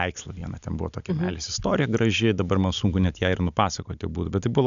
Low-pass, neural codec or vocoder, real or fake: 7.2 kHz; none; real